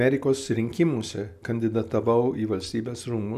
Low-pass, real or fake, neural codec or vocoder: 14.4 kHz; real; none